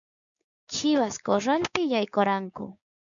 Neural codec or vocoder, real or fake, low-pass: codec, 16 kHz, 6 kbps, DAC; fake; 7.2 kHz